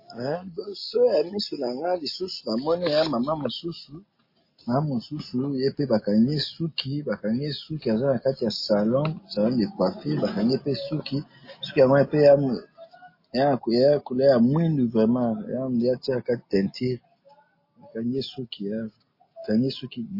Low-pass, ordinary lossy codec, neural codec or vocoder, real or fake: 5.4 kHz; MP3, 24 kbps; none; real